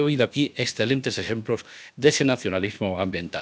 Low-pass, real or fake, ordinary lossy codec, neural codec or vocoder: none; fake; none; codec, 16 kHz, about 1 kbps, DyCAST, with the encoder's durations